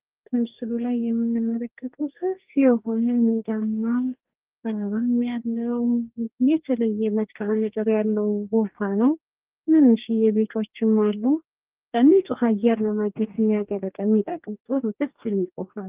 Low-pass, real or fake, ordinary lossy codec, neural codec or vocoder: 3.6 kHz; fake; Opus, 32 kbps; codec, 44.1 kHz, 2.6 kbps, DAC